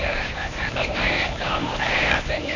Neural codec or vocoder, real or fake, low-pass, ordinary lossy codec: codec, 24 kHz, 0.9 kbps, WavTokenizer, medium speech release version 1; fake; 7.2 kHz; none